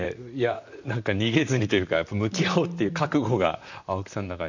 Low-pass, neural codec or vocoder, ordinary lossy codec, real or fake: 7.2 kHz; vocoder, 44.1 kHz, 128 mel bands, Pupu-Vocoder; none; fake